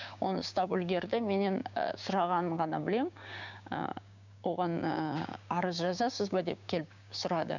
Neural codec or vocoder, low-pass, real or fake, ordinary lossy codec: codec, 16 kHz, 6 kbps, DAC; 7.2 kHz; fake; none